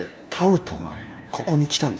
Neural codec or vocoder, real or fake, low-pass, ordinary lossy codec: codec, 16 kHz, 1 kbps, FunCodec, trained on LibriTTS, 50 frames a second; fake; none; none